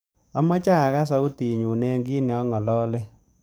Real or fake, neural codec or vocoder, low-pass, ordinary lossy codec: fake; codec, 44.1 kHz, 7.8 kbps, DAC; none; none